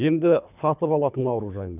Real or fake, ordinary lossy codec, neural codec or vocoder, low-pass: fake; none; codec, 24 kHz, 3 kbps, HILCodec; 3.6 kHz